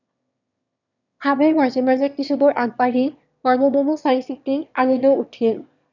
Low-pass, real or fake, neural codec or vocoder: 7.2 kHz; fake; autoencoder, 22.05 kHz, a latent of 192 numbers a frame, VITS, trained on one speaker